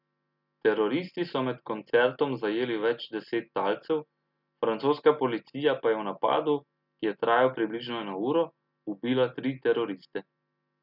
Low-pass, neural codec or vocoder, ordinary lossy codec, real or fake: 5.4 kHz; none; none; real